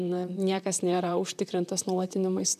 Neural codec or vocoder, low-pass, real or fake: vocoder, 44.1 kHz, 128 mel bands, Pupu-Vocoder; 14.4 kHz; fake